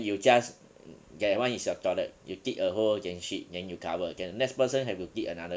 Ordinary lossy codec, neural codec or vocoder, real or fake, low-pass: none; none; real; none